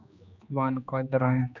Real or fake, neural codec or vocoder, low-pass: fake; codec, 16 kHz, 2 kbps, X-Codec, HuBERT features, trained on general audio; 7.2 kHz